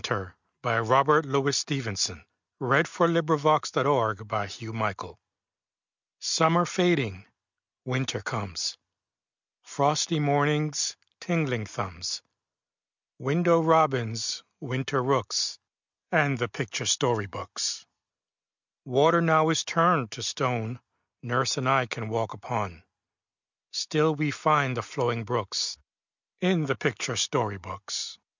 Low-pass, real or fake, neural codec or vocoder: 7.2 kHz; real; none